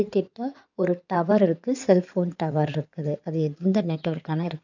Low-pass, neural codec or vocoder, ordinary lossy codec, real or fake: 7.2 kHz; codec, 16 kHz in and 24 kHz out, 2.2 kbps, FireRedTTS-2 codec; none; fake